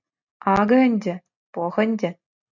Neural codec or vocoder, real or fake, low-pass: none; real; 7.2 kHz